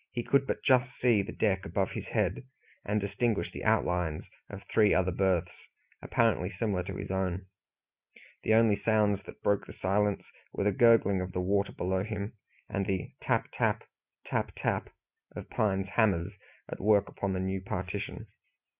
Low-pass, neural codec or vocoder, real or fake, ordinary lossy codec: 3.6 kHz; none; real; Opus, 64 kbps